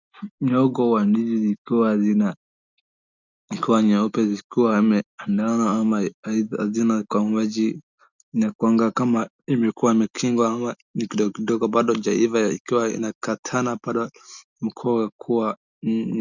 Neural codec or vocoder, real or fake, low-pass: none; real; 7.2 kHz